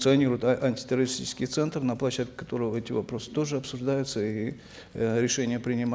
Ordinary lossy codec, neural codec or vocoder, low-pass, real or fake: none; none; none; real